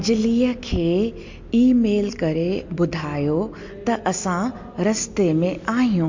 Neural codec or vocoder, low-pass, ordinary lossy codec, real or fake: none; 7.2 kHz; MP3, 48 kbps; real